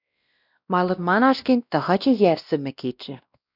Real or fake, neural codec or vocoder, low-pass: fake; codec, 16 kHz, 1 kbps, X-Codec, WavLM features, trained on Multilingual LibriSpeech; 5.4 kHz